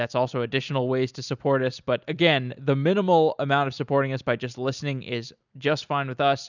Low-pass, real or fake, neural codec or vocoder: 7.2 kHz; real; none